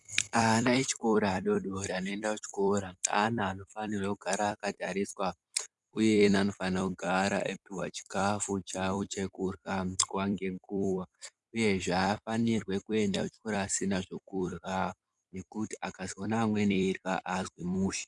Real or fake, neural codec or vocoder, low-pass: fake; vocoder, 44.1 kHz, 128 mel bands every 256 samples, BigVGAN v2; 10.8 kHz